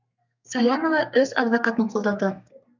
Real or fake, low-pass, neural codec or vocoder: fake; 7.2 kHz; codec, 32 kHz, 1.9 kbps, SNAC